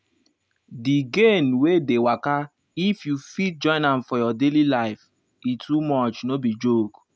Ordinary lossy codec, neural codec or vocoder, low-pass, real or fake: none; none; none; real